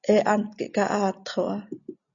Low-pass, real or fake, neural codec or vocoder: 7.2 kHz; real; none